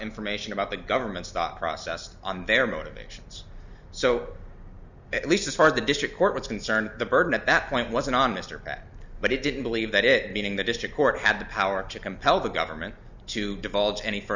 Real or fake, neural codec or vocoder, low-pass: real; none; 7.2 kHz